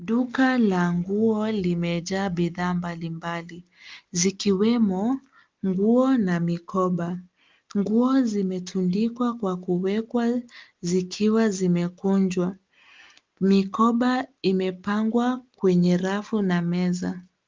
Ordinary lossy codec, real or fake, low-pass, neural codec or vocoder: Opus, 32 kbps; real; 7.2 kHz; none